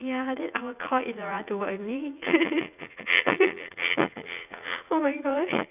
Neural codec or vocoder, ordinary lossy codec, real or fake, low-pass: vocoder, 44.1 kHz, 80 mel bands, Vocos; none; fake; 3.6 kHz